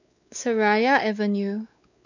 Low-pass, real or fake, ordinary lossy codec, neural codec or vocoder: 7.2 kHz; fake; none; codec, 16 kHz, 4 kbps, X-Codec, WavLM features, trained on Multilingual LibriSpeech